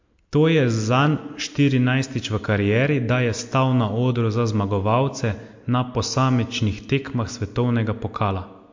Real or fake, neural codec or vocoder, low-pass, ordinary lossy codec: real; none; 7.2 kHz; MP3, 48 kbps